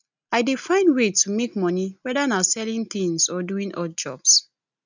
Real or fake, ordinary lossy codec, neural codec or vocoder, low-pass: real; none; none; 7.2 kHz